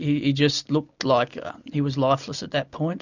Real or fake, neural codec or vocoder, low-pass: real; none; 7.2 kHz